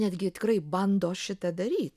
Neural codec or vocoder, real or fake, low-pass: none; real; 14.4 kHz